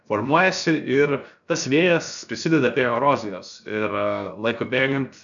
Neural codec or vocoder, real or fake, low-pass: codec, 16 kHz, 0.7 kbps, FocalCodec; fake; 7.2 kHz